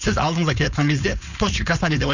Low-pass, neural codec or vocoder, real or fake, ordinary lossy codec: 7.2 kHz; codec, 16 kHz, 4.8 kbps, FACodec; fake; none